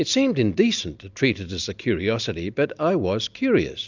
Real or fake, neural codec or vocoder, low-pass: real; none; 7.2 kHz